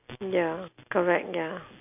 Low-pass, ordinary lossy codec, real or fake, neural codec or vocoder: 3.6 kHz; none; real; none